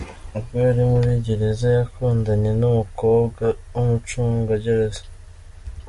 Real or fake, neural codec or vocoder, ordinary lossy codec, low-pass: real; none; MP3, 48 kbps; 14.4 kHz